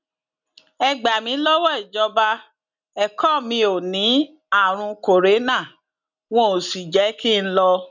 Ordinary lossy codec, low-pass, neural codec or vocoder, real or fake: none; 7.2 kHz; none; real